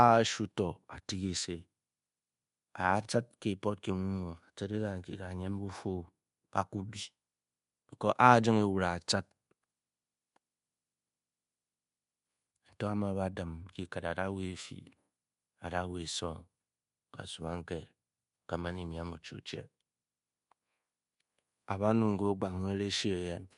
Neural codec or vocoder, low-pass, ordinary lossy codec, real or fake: codec, 24 kHz, 1.2 kbps, DualCodec; 10.8 kHz; MP3, 64 kbps; fake